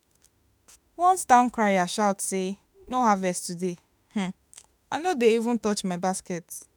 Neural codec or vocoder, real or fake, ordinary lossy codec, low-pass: autoencoder, 48 kHz, 32 numbers a frame, DAC-VAE, trained on Japanese speech; fake; none; none